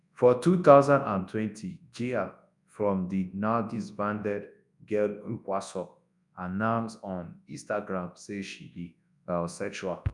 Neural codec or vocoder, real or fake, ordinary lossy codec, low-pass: codec, 24 kHz, 0.9 kbps, WavTokenizer, large speech release; fake; none; 10.8 kHz